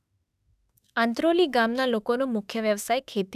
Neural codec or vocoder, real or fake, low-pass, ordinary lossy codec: autoencoder, 48 kHz, 32 numbers a frame, DAC-VAE, trained on Japanese speech; fake; 14.4 kHz; none